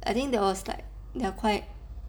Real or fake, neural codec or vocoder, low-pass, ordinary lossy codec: real; none; none; none